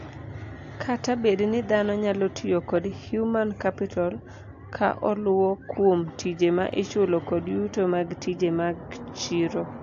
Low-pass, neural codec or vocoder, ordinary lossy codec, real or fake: 7.2 kHz; none; AAC, 48 kbps; real